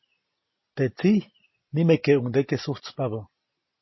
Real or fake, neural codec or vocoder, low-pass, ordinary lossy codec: real; none; 7.2 kHz; MP3, 24 kbps